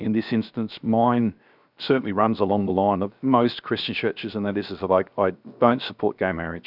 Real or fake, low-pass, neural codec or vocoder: fake; 5.4 kHz; codec, 16 kHz, 0.7 kbps, FocalCodec